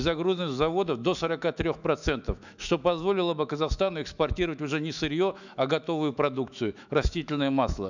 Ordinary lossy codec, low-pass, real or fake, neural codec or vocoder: none; 7.2 kHz; fake; autoencoder, 48 kHz, 128 numbers a frame, DAC-VAE, trained on Japanese speech